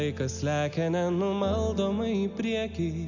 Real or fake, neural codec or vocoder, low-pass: real; none; 7.2 kHz